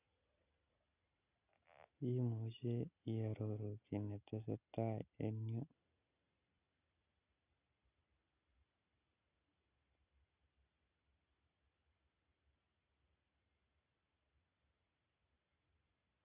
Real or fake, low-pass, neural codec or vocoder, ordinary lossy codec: real; 3.6 kHz; none; none